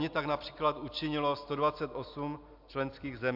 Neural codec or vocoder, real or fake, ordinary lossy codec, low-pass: none; real; MP3, 48 kbps; 5.4 kHz